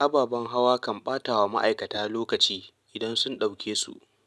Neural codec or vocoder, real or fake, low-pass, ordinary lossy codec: none; real; none; none